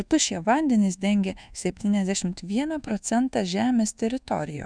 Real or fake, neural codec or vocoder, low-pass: fake; codec, 24 kHz, 1.2 kbps, DualCodec; 9.9 kHz